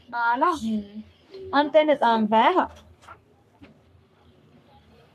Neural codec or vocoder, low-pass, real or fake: codec, 44.1 kHz, 3.4 kbps, Pupu-Codec; 14.4 kHz; fake